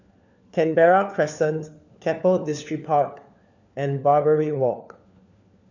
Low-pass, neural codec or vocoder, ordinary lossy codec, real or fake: 7.2 kHz; codec, 16 kHz, 4 kbps, FunCodec, trained on LibriTTS, 50 frames a second; none; fake